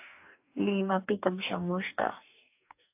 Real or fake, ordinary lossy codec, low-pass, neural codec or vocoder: fake; AAC, 32 kbps; 3.6 kHz; codec, 44.1 kHz, 2.6 kbps, DAC